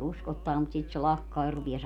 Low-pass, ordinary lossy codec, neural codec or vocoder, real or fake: 19.8 kHz; none; none; real